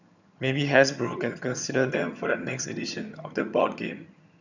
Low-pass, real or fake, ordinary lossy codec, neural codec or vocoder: 7.2 kHz; fake; none; vocoder, 22.05 kHz, 80 mel bands, HiFi-GAN